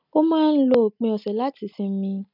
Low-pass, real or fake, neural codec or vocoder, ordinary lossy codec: 5.4 kHz; real; none; none